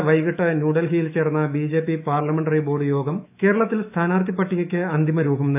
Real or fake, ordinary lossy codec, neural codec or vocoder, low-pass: fake; AAC, 32 kbps; autoencoder, 48 kHz, 128 numbers a frame, DAC-VAE, trained on Japanese speech; 3.6 kHz